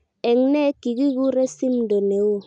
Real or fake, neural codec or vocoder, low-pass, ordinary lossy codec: real; none; 9.9 kHz; none